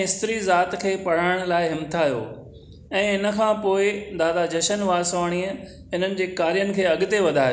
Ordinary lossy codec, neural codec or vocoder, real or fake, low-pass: none; none; real; none